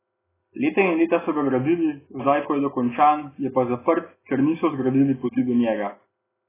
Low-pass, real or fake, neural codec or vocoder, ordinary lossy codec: 3.6 kHz; real; none; AAC, 16 kbps